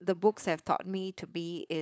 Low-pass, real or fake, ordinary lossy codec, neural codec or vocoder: none; fake; none; codec, 16 kHz, 4.8 kbps, FACodec